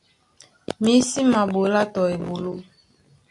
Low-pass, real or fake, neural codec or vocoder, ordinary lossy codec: 10.8 kHz; real; none; AAC, 64 kbps